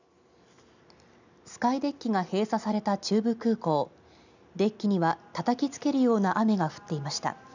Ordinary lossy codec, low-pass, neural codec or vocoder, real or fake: none; 7.2 kHz; none; real